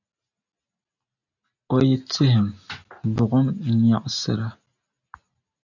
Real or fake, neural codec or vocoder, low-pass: real; none; 7.2 kHz